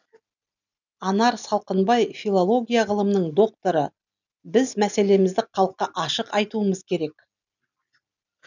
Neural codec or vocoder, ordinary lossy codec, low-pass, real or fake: none; none; 7.2 kHz; real